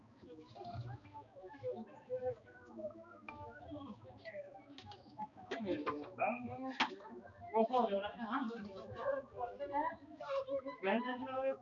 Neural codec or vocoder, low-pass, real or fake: codec, 16 kHz, 2 kbps, X-Codec, HuBERT features, trained on balanced general audio; 7.2 kHz; fake